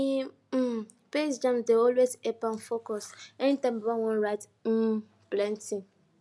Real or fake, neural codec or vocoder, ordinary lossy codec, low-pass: real; none; none; none